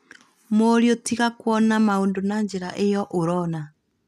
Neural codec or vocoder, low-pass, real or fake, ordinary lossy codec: none; 14.4 kHz; real; none